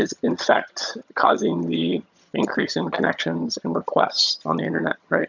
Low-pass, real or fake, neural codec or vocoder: 7.2 kHz; fake; vocoder, 22.05 kHz, 80 mel bands, HiFi-GAN